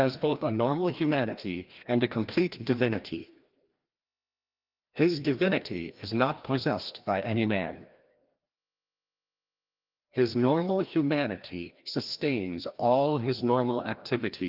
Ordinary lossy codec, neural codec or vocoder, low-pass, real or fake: Opus, 32 kbps; codec, 16 kHz, 1 kbps, FreqCodec, larger model; 5.4 kHz; fake